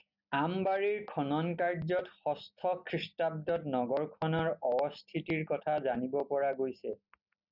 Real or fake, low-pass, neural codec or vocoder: real; 5.4 kHz; none